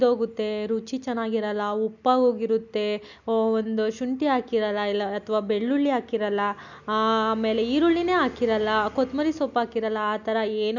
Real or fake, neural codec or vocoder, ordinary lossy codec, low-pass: real; none; none; 7.2 kHz